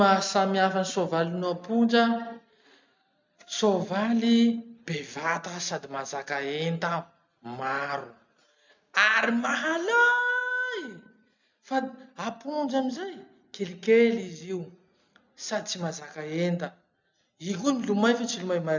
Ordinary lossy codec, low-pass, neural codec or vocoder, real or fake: MP3, 64 kbps; 7.2 kHz; none; real